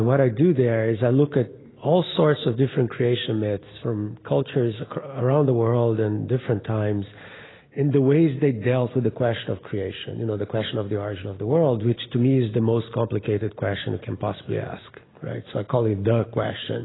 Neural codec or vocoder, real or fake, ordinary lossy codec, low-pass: none; real; AAC, 16 kbps; 7.2 kHz